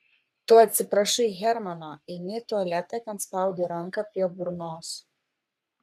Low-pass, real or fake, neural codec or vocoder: 14.4 kHz; fake; codec, 44.1 kHz, 3.4 kbps, Pupu-Codec